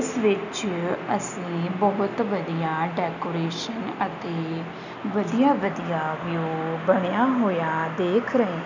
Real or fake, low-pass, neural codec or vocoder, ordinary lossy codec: real; 7.2 kHz; none; none